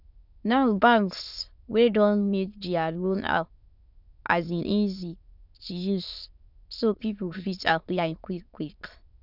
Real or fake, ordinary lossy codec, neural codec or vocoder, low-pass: fake; none; autoencoder, 22.05 kHz, a latent of 192 numbers a frame, VITS, trained on many speakers; 5.4 kHz